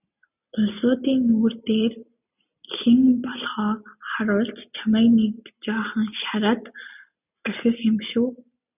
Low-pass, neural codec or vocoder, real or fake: 3.6 kHz; none; real